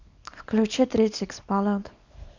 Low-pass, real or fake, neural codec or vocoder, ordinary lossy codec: 7.2 kHz; fake; codec, 24 kHz, 0.9 kbps, WavTokenizer, small release; none